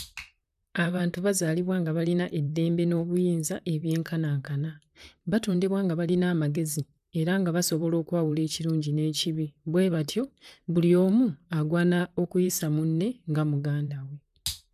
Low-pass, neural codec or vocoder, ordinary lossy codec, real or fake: 14.4 kHz; vocoder, 44.1 kHz, 128 mel bands, Pupu-Vocoder; none; fake